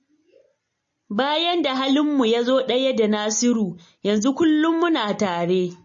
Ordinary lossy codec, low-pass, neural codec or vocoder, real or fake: MP3, 32 kbps; 7.2 kHz; none; real